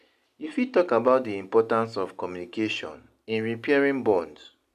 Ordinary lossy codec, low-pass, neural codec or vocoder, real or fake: none; 14.4 kHz; none; real